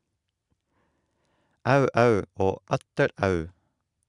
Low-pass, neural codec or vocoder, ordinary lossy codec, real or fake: 10.8 kHz; none; Opus, 64 kbps; real